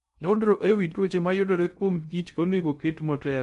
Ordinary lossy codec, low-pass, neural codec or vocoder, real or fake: MP3, 64 kbps; 10.8 kHz; codec, 16 kHz in and 24 kHz out, 0.6 kbps, FocalCodec, streaming, 2048 codes; fake